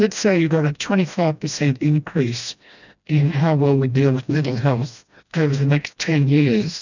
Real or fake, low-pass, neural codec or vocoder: fake; 7.2 kHz; codec, 16 kHz, 1 kbps, FreqCodec, smaller model